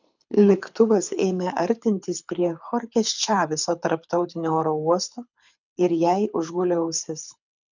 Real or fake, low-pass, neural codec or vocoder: fake; 7.2 kHz; codec, 24 kHz, 6 kbps, HILCodec